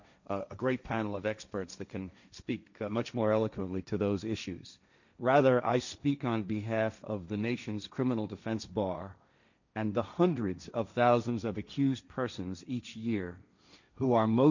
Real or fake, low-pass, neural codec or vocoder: fake; 7.2 kHz; codec, 16 kHz, 1.1 kbps, Voila-Tokenizer